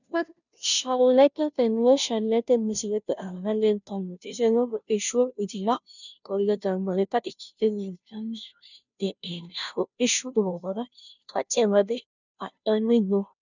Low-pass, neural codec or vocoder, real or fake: 7.2 kHz; codec, 16 kHz, 0.5 kbps, FunCodec, trained on Chinese and English, 25 frames a second; fake